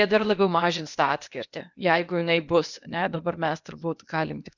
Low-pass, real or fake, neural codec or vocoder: 7.2 kHz; fake; codec, 16 kHz, 0.8 kbps, ZipCodec